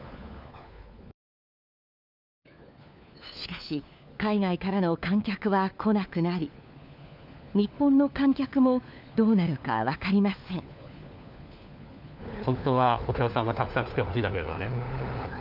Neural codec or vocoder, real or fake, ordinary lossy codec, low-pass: codec, 16 kHz, 4 kbps, FunCodec, trained on LibriTTS, 50 frames a second; fake; none; 5.4 kHz